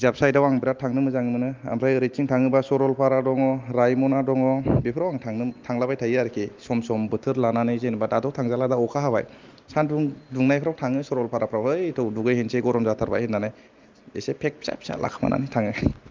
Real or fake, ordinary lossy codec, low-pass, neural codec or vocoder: real; Opus, 32 kbps; 7.2 kHz; none